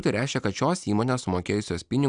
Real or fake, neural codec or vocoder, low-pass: real; none; 9.9 kHz